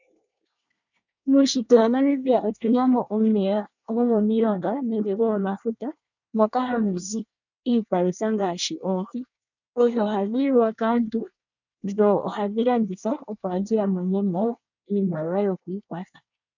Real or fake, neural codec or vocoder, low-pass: fake; codec, 24 kHz, 1 kbps, SNAC; 7.2 kHz